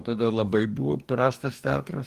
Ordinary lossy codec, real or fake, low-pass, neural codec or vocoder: Opus, 24 kbps; fake; 14.4 kHz; codec, 44.1 kHz, 2.6 kbps, DAC